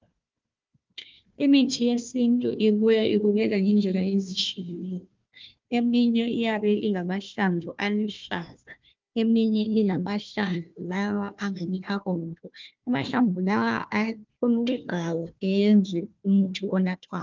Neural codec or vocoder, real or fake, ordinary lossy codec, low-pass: codec, 16 kHz, 1 kbps, FunCodec, trained on Chinese and English, 50 frames a second; fake; Opus, 24 kbps; 7.2 kHz